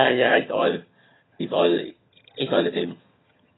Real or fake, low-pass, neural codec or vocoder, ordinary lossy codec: fake; 7.2 kHz; vocoder, 22.05 kHz, 80 mel bands, HiFi-GAN; AAC, 16 kbps